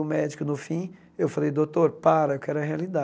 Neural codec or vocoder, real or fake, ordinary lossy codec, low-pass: none; real; none; none